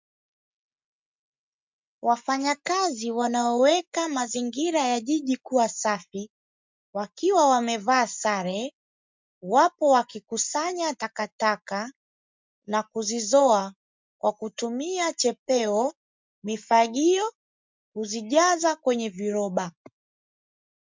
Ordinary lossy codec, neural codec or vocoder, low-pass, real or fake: MP3, 64 kbps; none; 7.2 kHz; real